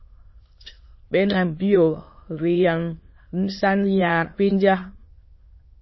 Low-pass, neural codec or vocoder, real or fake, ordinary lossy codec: 7.2 kHz; autoencoder, 22.05 kHz, a latent of 192 numbers a frame, VITS, trained on many speakers; fake; MP3, 24 kbps